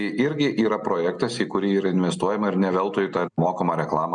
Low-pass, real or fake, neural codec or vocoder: 9.9 kHz; real; none